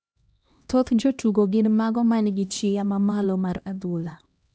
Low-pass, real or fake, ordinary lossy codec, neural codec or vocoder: none; fake; none; codec, 16 kHz, 1 kbps, X-Codec, HuBERT features, trained on LibriSpeech